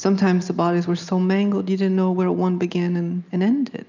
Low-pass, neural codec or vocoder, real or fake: 7.2 kHz; none; real